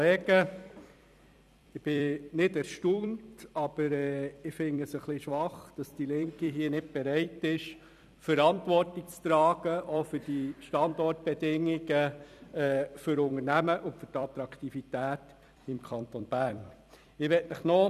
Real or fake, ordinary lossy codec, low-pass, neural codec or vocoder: fake; none; 14.4 kHz; vocoder, 44.1 kHz, 128 mel bands every 256 samples, BigVGAN v2